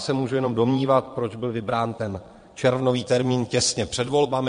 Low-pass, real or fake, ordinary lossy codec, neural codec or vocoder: 9.9 kHz; fake; MP3, 48 kbps; vocoder, 22.05 kHz, 80 mel bands, Vocos